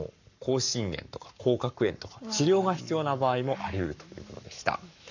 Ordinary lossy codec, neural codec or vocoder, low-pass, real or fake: none; codec, 44.1 kHz, 7.8 kbps, Pupu-Codec; 7.2 kHz; fake